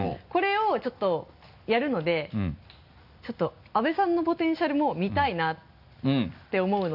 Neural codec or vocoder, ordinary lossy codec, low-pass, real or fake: none; none; 5.4 kHz; real